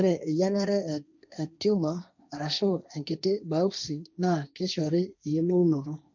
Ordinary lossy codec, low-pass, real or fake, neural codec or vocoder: none; 7.2 kHz; fake; codec, 16 kHz, 1.1 kbps, Voila-Tokenizer